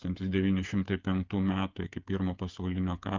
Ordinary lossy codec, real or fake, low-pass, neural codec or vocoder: Opus, 24 kbps; fake; 7.2 kHz; codec, 16 kHz, 8 kbps, FreqCodec, smaller model